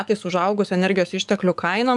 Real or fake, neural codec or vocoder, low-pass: fake; codec, 44.1 kHz, 7.8 kbps, DAC; 10.8 kHz